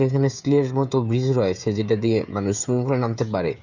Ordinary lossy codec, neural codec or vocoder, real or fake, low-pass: none; codec, 16 kHz, 16 kbps, FreqCodec, smaller model; fake; 7.2 kHz